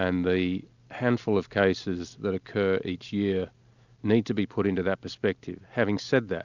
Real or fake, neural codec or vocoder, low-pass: real; none; 7.2 kHz